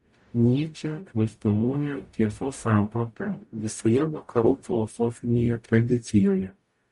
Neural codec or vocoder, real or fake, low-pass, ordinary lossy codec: codec, 44.1 kHz, 0.9 kbps, DAC; fake; 14.4 kHz; MP3, 48 kbps